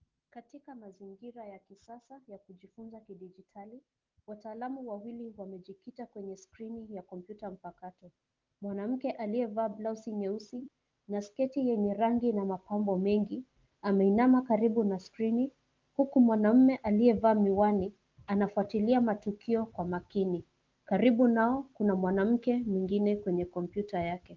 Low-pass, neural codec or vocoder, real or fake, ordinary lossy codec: 7.2 kHz; none; real; Opus, 32 kbps